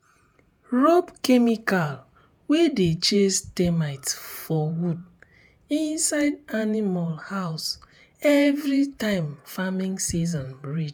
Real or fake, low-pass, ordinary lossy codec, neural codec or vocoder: fake; none; none; vocoder, 48 kHz, 128 mel bands, Vocos